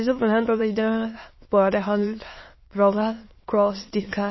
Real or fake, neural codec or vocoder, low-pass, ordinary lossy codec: fake; autoencoder, 22.05 kHz, a latent of 192 numbers a frame, VITS, trained on many speakers; 7.2 kHz; MP3, 24 kbps